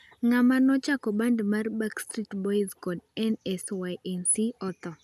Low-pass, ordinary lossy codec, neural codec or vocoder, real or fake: 14.4 kHz; none; none; real